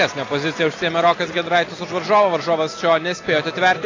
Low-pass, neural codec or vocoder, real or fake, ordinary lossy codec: 7.2 kHz; none; real; AAC, 32 kbps